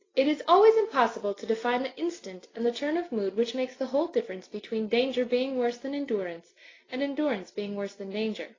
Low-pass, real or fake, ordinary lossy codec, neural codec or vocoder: 7.2 kHz; real; AAC, 32 kbps; none